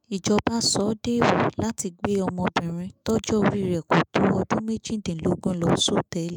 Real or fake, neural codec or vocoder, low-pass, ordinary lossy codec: fake; autoencoder, 48 kHz, 128 numbers a frame, DAC-VAE, trained on Japanese speech; none; none